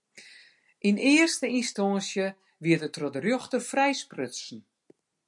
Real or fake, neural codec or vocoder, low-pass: real; none; 10.8 kHz